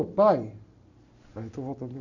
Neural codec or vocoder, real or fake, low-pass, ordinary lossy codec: none; real; 7.2 kHz; none